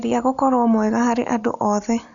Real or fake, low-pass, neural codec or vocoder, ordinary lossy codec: real; 7.2 kHz; none; none